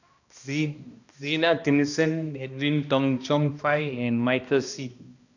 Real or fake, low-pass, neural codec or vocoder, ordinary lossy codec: fake; 7.2 kHz; codec, 16 kHz, 1 kbps, X-Codec, HuBERT features, trained on balanced general audio; none